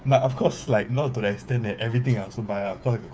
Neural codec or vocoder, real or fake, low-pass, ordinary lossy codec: codec, 16 kHz, 8 kbps, FreqCodec, smaller model; fake; none; none